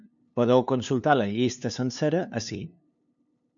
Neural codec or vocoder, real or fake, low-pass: codec, 16 kHz, 2 kbps, FunCodec, trained on LibriTTS, 25 frames a second; fake; 7.2 kHz